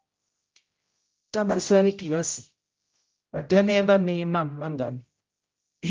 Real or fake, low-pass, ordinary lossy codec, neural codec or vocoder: fake; 7.2 kHz; Opus, 32 kbps; codec, 16 kHz, 0.5 kbps, X-Codec, HuBERT features, trained on general audio